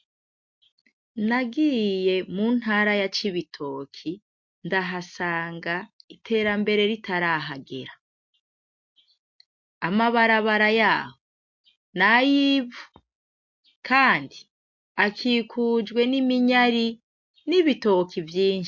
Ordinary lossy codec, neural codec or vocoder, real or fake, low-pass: MP3, 48 kbps; none; real; 7.2 kHz